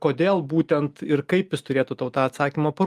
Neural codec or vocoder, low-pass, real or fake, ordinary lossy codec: none; 14.4 kHz; real; Opus, 32 kbps